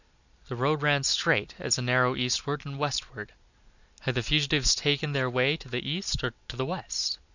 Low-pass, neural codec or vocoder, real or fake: 7.2 kHz; none; real